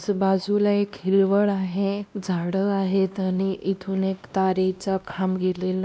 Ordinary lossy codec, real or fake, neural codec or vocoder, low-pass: none; fake; codec, 16 kHz, 1 kbps, X-Codec, WavLM features, trained on Multilingual LibriSpeech; none